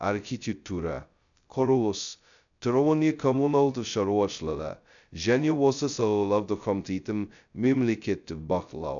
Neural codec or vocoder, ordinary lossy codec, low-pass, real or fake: codec, 16 kHz, 0.2 kbps, FocalCodec; none; 7.2 kHz; fake